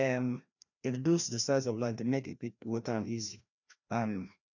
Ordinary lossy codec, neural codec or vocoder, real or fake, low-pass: none; codec, 16 kHz, 1 kbps, FreqCodec, larger model; fake; 7.2 kHz